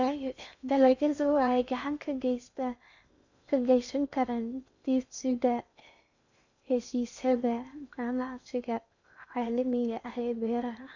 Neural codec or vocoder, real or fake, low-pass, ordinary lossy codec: codec, 16 kHz in and 24 kHz out, 0.6 kbps, FocalCodec, streaming, 2048 codes; fake; 7.2 kHz; none